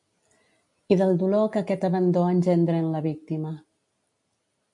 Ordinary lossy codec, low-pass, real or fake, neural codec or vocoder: MP3, 64 kbps; 10.8 kHz; real; none